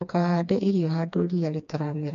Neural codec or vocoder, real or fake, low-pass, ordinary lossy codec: codec, 16 kHz, 2 kbps, FreqCodec, smaller model; fake; 7.2 kHz; none